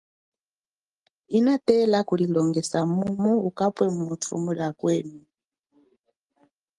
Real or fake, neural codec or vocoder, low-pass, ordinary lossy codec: real; none; 10.8 kHz; Opus, 24 kbps